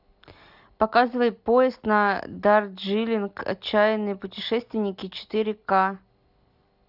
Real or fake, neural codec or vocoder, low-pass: real; none; 5.4 kHz